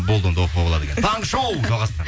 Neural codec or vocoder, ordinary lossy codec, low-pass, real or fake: none; none; none; real